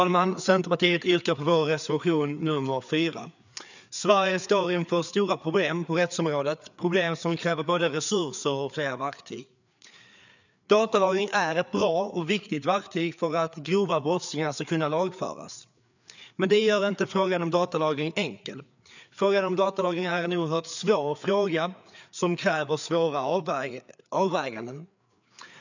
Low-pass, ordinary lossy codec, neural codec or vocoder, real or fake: 7.2 kHz; none; codec, 16 kHz, 4 kbps, FreqCodec, larger model; fake